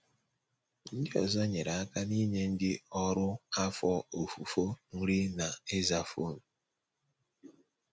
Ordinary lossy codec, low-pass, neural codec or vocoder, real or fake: none; none; none; real